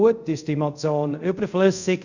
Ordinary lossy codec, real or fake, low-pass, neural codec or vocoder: none; fake; 7.2 kHz; codec, 24 kHz, 0.5 kbps, DualCodec